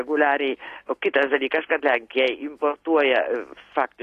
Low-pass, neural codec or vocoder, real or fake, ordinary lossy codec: 14.4 kHz; none; real; AAC, 48 kbps